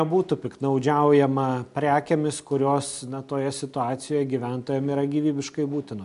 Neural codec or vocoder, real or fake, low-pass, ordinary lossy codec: none; real; 10.8 kHz; MP3, 64 kbps